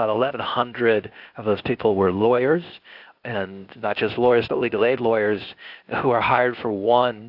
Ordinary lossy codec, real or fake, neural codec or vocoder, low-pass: AAC, 48 kbps; fake; codec, 16 kHz, 0.8 kbps, ZipCodec; 5.4 kHz